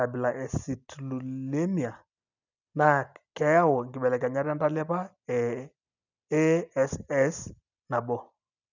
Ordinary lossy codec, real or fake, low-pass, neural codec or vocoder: none; real; 7.2 kHz; none